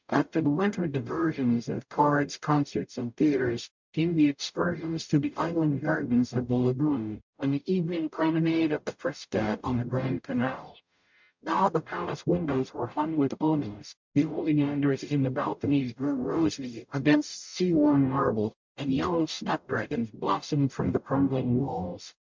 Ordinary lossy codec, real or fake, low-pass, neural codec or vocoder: MP3, 64 kbps; fake; 7.2 kHz; codec, 44.1 kHz, 0.9 kbps, DAC